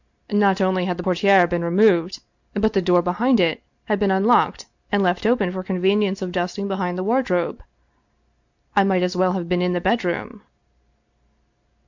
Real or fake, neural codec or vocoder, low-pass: real; none; 7.2 kHz